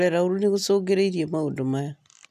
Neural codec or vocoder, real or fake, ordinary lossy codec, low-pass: none; real; none; 14.4 kHz